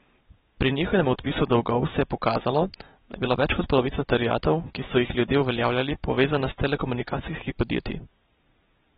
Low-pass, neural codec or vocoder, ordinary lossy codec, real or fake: 19.8 kHz; none; AAC, 16 kbps; real